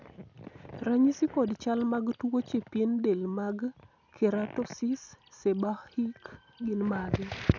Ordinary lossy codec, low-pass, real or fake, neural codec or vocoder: none; 7.2 kHz; real; none